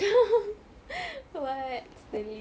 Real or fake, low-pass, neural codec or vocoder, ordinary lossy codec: real; none; none; none